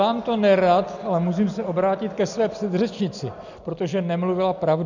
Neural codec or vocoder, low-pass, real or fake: none; 7.2 kHz; real